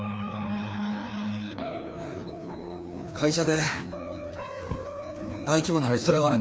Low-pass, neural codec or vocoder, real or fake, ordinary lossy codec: none; codec, 16 kHz, 2 kbps, FreqCodec, larger model; fake; none